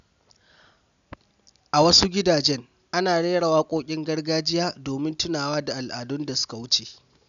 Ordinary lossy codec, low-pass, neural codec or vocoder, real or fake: none; 7.2 kHz; none; real